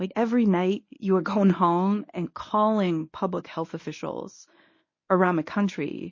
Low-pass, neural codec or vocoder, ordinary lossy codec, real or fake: 7.2 kHz; codec, 24 kHz, 0.9 kbps, WavTokenizer, medium speech release version 1; MP3, 32 kbps; fake